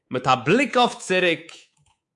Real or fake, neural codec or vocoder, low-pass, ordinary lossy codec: fake; codec, 24 kHz, 3.1 kbps, DualCodec; 10.8 kHz; AAC, 64 kbps